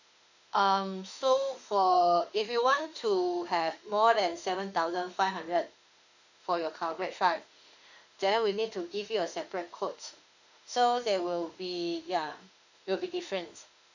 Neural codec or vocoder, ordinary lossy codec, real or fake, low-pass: autoencoder, 48 kHz, 32 numbers a frame, DAC-VAE, trained on Japanese speech; none; fake; 7.2 kHz